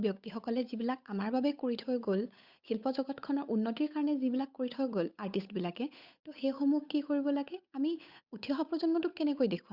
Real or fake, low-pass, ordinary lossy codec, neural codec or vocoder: fake; 5.4 kHz; Opus, 64 kbps; codec, 16 kHz, 8 kbps, FunCodec, trained on Chinese and English, 25 frames a second